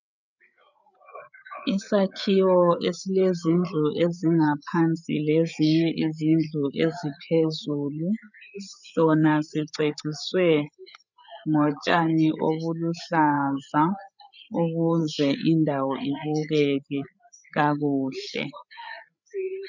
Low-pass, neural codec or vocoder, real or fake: 7.2 kHz; codec, 16 kHz, 8 kbps, FreqCodec, larger model; fake